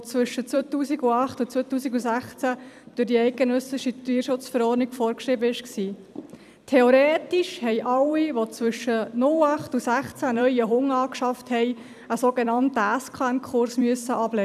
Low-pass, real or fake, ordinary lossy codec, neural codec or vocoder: 14.4 kHz; fake; none; vocoder, 44.1 kHz, 128 mel bands every 256 samples, BigVGAN v2